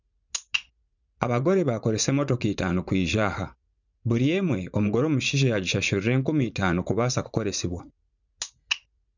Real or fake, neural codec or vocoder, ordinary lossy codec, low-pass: fake; vocoder, 44.1 kHz, 80 mel bands, Vocos; none; 7.2 kHz